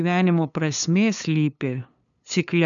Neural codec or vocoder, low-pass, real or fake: codec, 16 kHz, 2 kbps, FunCodec, trained on LibriTTS, 25 frames a second; 7.2 kHz; fake